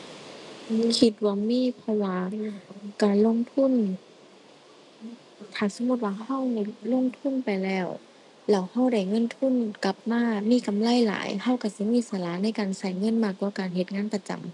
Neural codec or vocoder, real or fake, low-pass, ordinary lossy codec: none; real; 10.8 kHz; none